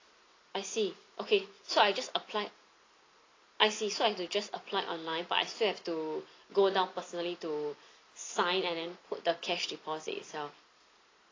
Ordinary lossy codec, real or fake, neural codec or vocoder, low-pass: AAC, 32 kbps; real; none; 7.2 kHz